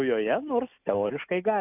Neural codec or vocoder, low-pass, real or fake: none; 3.6 kHz; real